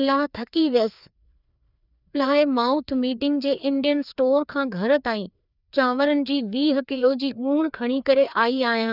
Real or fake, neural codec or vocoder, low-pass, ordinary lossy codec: fake; codec, 16 kHz, 2 kbps, FreqCodec, larger model; 5.4 kHz; none